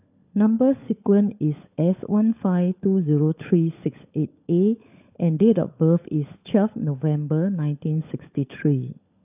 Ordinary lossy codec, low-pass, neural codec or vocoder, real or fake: AAC, 32 kbps; 3.6 kHz; codec, 16 kHz, 16 kbps, FunCodec, trained on LibriTTS, 50 frames a second; fake